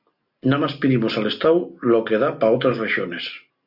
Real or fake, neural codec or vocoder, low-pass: real; none; 5.4 kHz